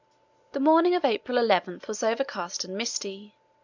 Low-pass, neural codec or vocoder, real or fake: 7.2 kHz; none; real